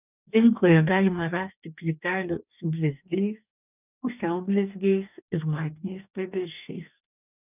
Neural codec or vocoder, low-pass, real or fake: codec, 44.1 kHz, 2.6 kbps, DAC; 3.6 kHz; fake